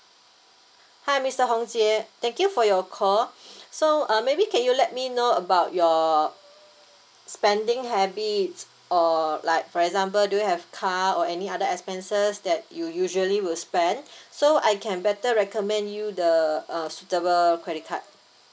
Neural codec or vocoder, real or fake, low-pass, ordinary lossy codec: none; real; none; none